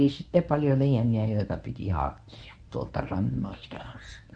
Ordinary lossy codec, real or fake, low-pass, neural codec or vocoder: none; fake; 9.9 kHz; codec, 24 kHz, 0.9 kbps, WavTokenizer, medium speech release version 1